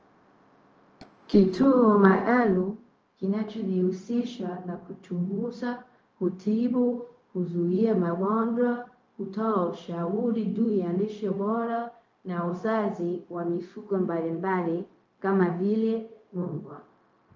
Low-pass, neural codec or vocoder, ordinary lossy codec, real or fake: 7.2 kHz; codec, 16 kHz, 0.4 kbps, LongCat-Audio-Codec; Opus, 24 kbps; fake